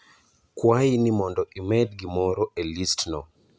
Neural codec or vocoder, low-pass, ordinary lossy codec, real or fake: none; none; none; real